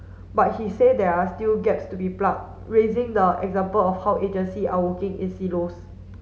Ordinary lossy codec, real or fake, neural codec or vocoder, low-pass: none; real; none; none